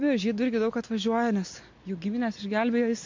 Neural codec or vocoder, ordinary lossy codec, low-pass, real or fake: none; MP3, 48 kbps; 7.2 kHz; real